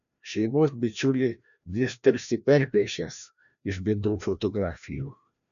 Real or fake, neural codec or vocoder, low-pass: fake; codec, 16 kHz, 1 kbps, FreqCodec, larger model; 7.2 kHz